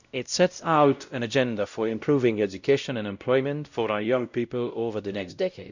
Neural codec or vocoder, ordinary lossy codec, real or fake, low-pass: codec, 16 kHz, 0.5 kbps, X-Codec, WavLM features, trained on Multilingual LibriSpeech; none; fake; 7.2 kHz